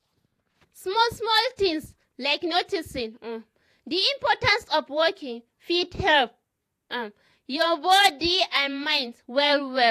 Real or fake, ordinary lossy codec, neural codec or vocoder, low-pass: fake; AAC, 64 kbps; vocoder, 48 kHz, 128 mel bands, Vocos; 14.4 kHz